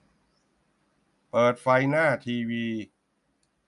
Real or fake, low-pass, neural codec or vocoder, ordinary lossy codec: real; 10.8 kHz; none; none